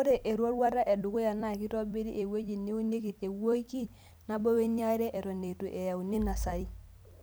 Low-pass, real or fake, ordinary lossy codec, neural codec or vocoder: none; fake; none; vocoder, 44.1 kHz, 128 mel bands every 256 samples, BigVGAN v2